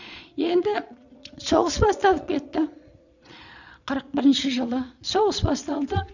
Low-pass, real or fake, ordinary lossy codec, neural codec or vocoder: 7.2 kHz; fake; none; vocoder, 22.05 kHz, 80 mel bands, Vocos